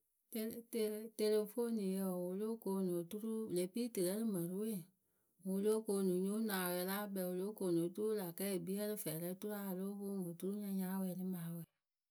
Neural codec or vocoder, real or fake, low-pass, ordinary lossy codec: none; real; none; none